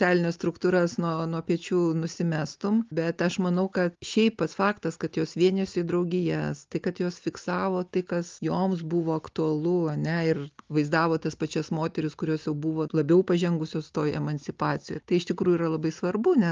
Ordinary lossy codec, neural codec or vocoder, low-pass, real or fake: Opus, 32 kbps; none; 7.2 kHz; real